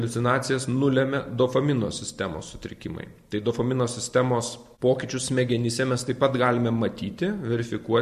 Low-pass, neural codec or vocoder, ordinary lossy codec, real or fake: 14.4 kHz; none; MP3, 64 kbps; real